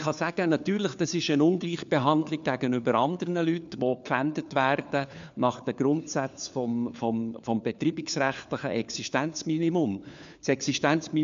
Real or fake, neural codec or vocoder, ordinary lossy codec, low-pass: fake; codec, 16 kHz, 4 kbps, FunCodec, trained on LibriTTS, 50 frames a second; MP3, 64 kbps; 7.2 kHz